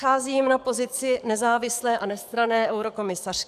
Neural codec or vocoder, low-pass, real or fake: codec, 44.1 kHz, 7.8 kbps, DAC; 14.4 kHz; fake